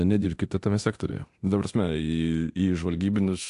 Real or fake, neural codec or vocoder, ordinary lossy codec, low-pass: fake; codec, 24 kHz, 0.9 kbps, DualCodec; AAC, 64 kbps; 10.8 kHz